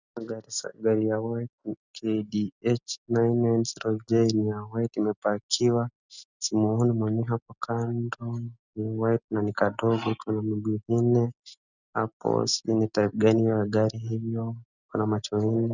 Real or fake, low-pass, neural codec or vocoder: real; 7.2 kHz; none